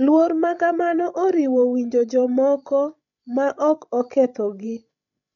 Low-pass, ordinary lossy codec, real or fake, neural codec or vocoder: 7.2 kHz; none; fake; codec, 16 kHz, 16 kbps, FreqCodec, larger model